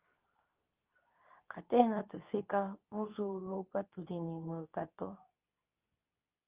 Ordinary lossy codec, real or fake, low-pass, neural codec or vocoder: Opus, 32 kbps; fake; 3.6 kHz; codec, 24 kHz, 0.9 kbps, WavTokenizer, medium speech release version 2